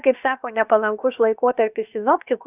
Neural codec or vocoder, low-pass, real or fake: codec, 16 kHz, about 1 kbps, DyCAST, with the encoder's durations; 3.6 kHz; fake